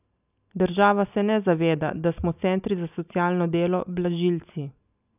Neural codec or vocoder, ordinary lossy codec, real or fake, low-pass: none; none; real; 3.6 kHz